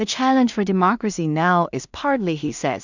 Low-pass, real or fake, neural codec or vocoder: 7.2 kHz; fake; codec, 16 kHz in and 24 kHz out, 0.4 kbps, LongCat-Audio-Codec, two codebook decoder